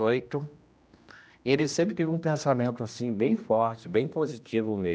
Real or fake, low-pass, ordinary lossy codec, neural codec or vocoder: fake; none; none; codec, 16 kHz, 1 kbps, X-Codec, HuBERT features, trained on general audio